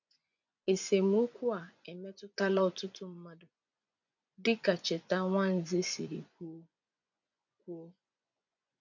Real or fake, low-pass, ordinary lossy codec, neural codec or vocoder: fake; 7.2 kHz; none; vocoder, 24 kHz, 100 mel bands, Vocos